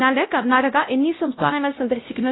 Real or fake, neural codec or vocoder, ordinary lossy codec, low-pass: fake; codec, 16 kHz, 0.5 kbps, X-Codec, WavLM features, trained on Multilingual LibriSpeech; AAC, 16 kbps; 7.2 kHz